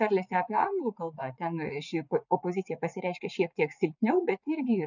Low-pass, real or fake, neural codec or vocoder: 7.2 kHz; fake; vocoder, 44.1 kHz, 80 mel bands, Vocos